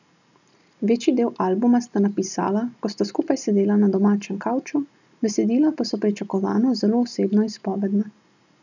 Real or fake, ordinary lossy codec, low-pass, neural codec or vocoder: real; none; 7.2 kHz; none